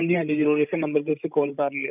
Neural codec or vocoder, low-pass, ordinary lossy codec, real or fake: codec, 16 kHz, 16 kbps, FreqCodec, larger model; 3.6 kHz; none; fake